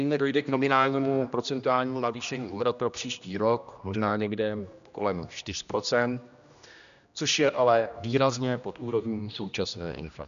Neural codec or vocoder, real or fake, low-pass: codec, 16 kHz, 1 kbps, X-Codec, HuBERT features, trained on general audio; fake; 7.2 kHz